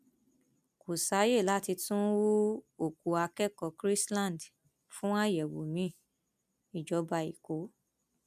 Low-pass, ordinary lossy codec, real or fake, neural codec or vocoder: 14.4 kHz; none; real; none